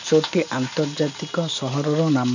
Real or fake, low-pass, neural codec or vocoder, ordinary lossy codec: real; 7.2 kHz; none; none